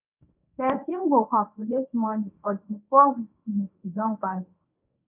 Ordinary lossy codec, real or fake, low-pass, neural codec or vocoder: none; fake; 3.6 kHz; codec, 24 kHz, 0.9 kbps, WavTokenizer, medium speech release version 1